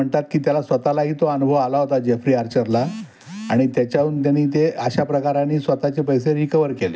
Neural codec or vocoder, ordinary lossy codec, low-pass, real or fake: none; none; none; real